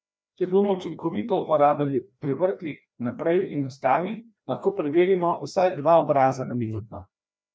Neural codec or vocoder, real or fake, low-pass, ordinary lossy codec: codec, 16 kHz, 1 kbps, FreqCodec, larger model; fake; none; none